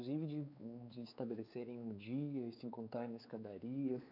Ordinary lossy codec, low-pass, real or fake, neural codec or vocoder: none; 5.4 kHz; fake; codec, 16 kHz, 4 kbps, X-Codec, WavLM features, trained on Multilingual LibriSpeech